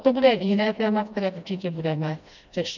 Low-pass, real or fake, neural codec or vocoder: 7.2 kHz; fake; codec, 16 kHz, 1 kbps, FreqCodec, smaller model